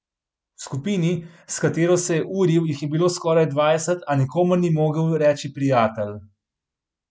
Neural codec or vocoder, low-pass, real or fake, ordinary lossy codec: none; none; real; none